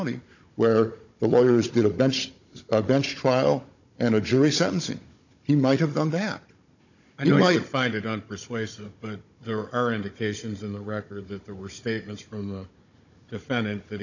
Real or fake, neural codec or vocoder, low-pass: fake; codec, 16 kHz, 16 kbps, FunCodec, trained on Chinese and English, 50 frames a second; 7.2 kHz